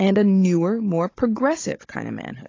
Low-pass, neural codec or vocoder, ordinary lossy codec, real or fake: 7.2 kHz; codec, 16 kHz, 16 kbps, FunCodec, trained on Chinese and English, 50 frames a second; AAC, 32 kbps; fake